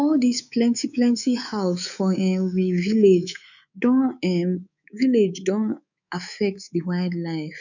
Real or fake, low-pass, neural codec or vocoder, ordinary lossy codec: fake; 7.2 kHz; codec, 24 kHz, 3.1 kbps, DualCodec; none